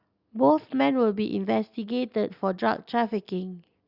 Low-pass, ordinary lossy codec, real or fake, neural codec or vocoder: 5.4 kHz; Opus, 64 kbps; real; none